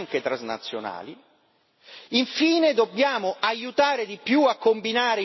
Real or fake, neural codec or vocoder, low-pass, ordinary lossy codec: real; none; 7.2 kHz; MP3, 24 kbps